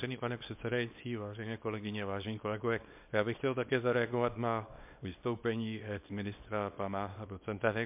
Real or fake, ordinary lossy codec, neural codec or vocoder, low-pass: fake; MP3, 32 kbps; codec, 24 kHz, 0.9 kbps, WavTokenizer, small release; 3.6 kHz